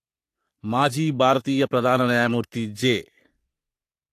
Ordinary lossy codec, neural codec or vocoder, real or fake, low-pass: AAC, 64 kbps; codec, 44.1 kHz, 3.4 kbps, Pupu-Codec; fake; 14.4 kHz